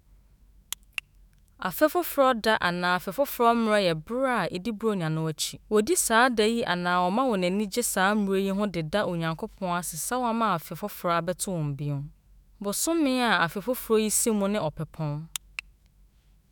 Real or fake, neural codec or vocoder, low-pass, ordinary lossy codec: fake; autoencoder, 48 kHz, 128 numbers a frame, DAC-VAE, trained on Japanese speech; none; none